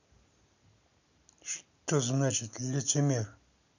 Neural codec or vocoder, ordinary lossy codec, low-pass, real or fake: none; none; 7.2 kHz; real